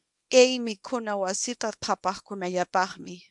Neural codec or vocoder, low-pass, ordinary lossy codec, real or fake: codec, 24 kHz, 0.9 kbps, WavTokenizer, small release; 10.8 kHz; MP3, 64 kbps; fake